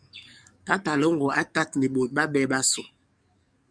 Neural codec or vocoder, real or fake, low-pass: codec, 44.1 kHz, 7.8 kbps, DAC; fake; 9.9 kHz